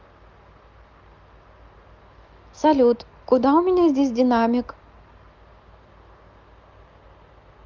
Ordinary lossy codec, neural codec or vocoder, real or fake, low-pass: Opus, 24 kbps; none; real; 7.2 kHz